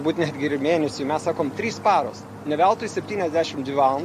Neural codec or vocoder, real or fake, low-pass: none; real; 14.4 kHz